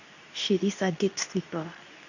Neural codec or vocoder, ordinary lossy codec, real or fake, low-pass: codec, 24 kHz, 0.9 kbps, WavTokenizer, medium speech release version 2; none; fake; 7.2 kHz